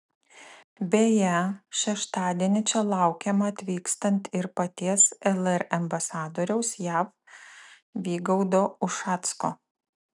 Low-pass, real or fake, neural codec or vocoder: 10.8 kHz; real; none